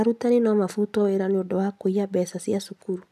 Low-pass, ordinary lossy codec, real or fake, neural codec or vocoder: 14.4 kHz; none; real; none